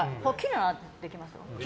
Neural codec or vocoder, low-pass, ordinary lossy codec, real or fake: none; none; none; real